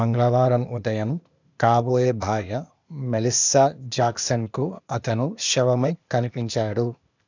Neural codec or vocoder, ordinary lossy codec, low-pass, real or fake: codec, 16 kHz, 0.8 kbps, ZipCodec; none; 7.2 kHz; fake